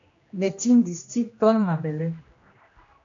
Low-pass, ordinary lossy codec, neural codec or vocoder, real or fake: 7.2 kHz; AAC, 48 kbps; codec, 16 kHz, 1 kbps, X-Codec, HuBERT features, trained on general audio; fake